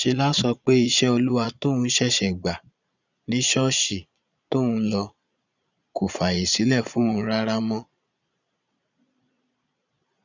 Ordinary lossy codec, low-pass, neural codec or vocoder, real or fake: none; 7.2 kHz; vocoder, 22.05 kHz, 80 mel bands, Vocos; fake